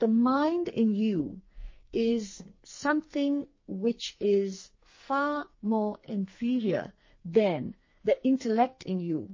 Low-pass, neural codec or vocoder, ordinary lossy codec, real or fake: 7.2 kHz; codec, 44.1 kHz, 2.6 kbps, SNAC; MP3, 32 kbps; fake